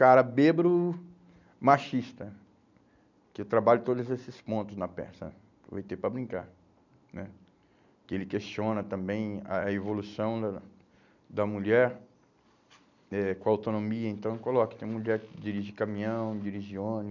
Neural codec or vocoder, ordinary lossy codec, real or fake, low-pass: none; none; real; 7.2 kHz